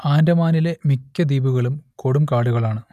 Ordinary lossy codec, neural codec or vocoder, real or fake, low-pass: none; none; real; 14.4 kHz